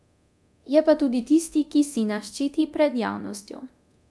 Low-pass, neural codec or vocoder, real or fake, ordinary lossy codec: none; codec, 24 kHz, 0.9 kbps, DualCodec; fake; none